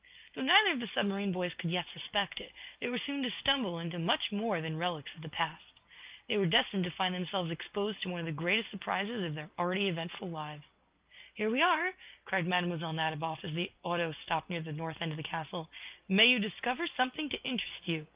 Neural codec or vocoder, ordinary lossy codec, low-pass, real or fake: none; Opus, 32 kbps; 3.6 kHz; real